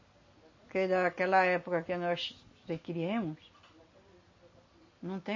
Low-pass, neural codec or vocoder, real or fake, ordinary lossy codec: 7.2 kHz; none; real; MP3, 32 kbps